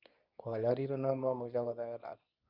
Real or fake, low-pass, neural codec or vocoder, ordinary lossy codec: fake; 5.4 kHz; codec, 24 kHz, 0.9 kbps, WavTokenizer, medium speech release version 2; none